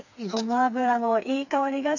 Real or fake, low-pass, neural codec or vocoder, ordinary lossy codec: fake; 7.2 kHz; codec, 16 kHz, 4 kbps, FreqCodec, smaller model; none